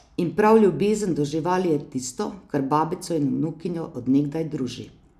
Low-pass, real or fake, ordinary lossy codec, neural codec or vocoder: 14.4 kHz; real; none; none